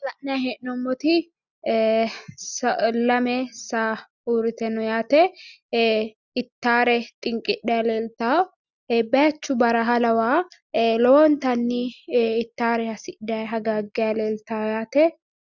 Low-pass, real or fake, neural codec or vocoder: 7.2 kHz; real; none